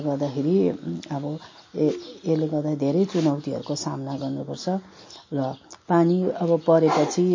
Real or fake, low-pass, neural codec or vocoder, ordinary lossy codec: real; 7.2 kHz; none; MP3, 32 kbps